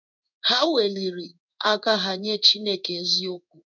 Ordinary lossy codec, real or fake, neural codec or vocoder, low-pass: none; fake; codec, 16 kHz in and 24 kHz out, 1 kbps, XY-Tokenizer; 7.2 kHz